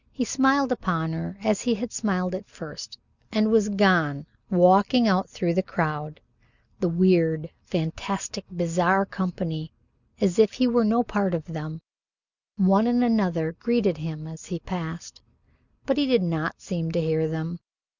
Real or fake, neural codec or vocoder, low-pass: real; none; 7.2 kHz